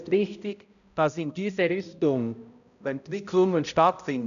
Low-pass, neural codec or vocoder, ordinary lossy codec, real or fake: 7.2 kHz; codec, 16 kHz, 0.5 kbps, X-Codec, HuBERT features, trained on balanced general audio; none; fake